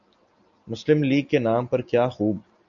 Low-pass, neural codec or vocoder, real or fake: 7.2 kHz; none; real